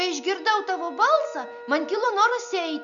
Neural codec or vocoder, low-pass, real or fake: none; 7.2 kHz; real